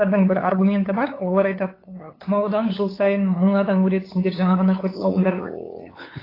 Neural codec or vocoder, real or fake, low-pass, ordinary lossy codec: codec, 16 kHz, 8 kbps, FunCodec, trained on LibriTTS, 25 frames a second; fake; 5.4 kHz; AAC, 24 kbps